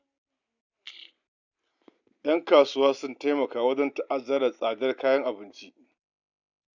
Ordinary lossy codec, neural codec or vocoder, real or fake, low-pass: none; none; real; 7.2 kHz